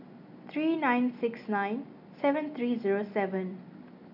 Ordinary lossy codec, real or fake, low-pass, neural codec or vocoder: none; real; 5.4 kHz; none